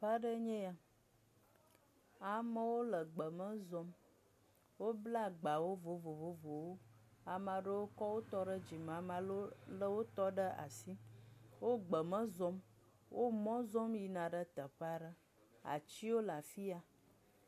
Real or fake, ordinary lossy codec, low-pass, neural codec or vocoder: real; MP3, 64 kbps; 14.4 kHz; none